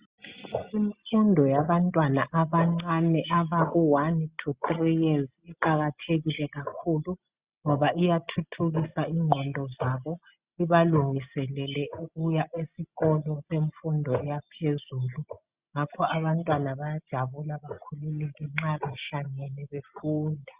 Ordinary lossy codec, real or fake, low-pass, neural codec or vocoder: Opus, 64 kbps; real; 3.6 kHz; none